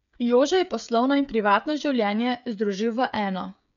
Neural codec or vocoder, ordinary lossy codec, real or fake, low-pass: codec, 16 kHz, 8 kbps, FreqCodec, smaller model; none; fake; 7.2 kHz